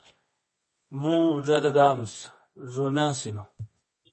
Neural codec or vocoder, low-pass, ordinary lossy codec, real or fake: codec, 24 kHz, 0.9 kbps, WavTokenizer, medium music audio release; 10.8 kHz; MP3, 32 kbps; fake